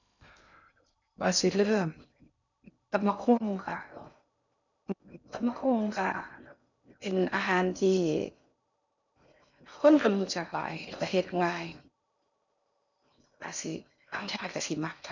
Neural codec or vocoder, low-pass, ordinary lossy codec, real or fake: codec, 16 kHz in and 24 kHz out, 0.6 kbps, FocalCodec, streaming, 2048 codes; 7.2 kHz; none; fake